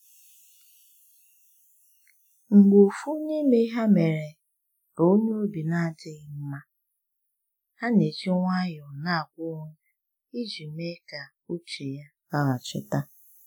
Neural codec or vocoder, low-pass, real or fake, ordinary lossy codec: none; none; real; none